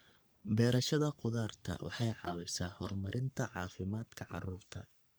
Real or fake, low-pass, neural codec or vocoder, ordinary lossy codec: fake; none; codec, 44.1 kHz, 3.4 kbps, Pupu-Codec; none